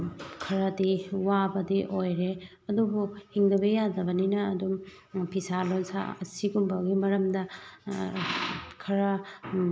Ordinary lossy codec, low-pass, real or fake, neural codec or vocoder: none; none; real; none